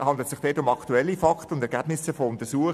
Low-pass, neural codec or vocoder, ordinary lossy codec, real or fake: 14.4 kHz; none; MP3, 96 kbps; real